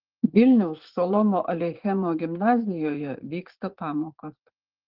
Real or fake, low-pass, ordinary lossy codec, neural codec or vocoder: fake; 5.4 kHz; Opus, 16 kbps; vocoder, 44.1 kHz, 80 mel bands, Vocos